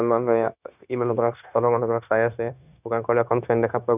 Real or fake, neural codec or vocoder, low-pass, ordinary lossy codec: fake; codec, 16 kHz, 0.9 kbps, LongCat-Audio-Codec; 3.6 kHz; none